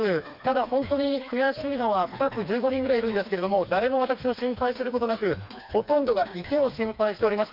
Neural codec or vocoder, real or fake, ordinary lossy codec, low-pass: codec, 16 kHz, 2 kbps, FreqCodec, smaller model; fake; AAC, 48 kbps; 5.4 kHz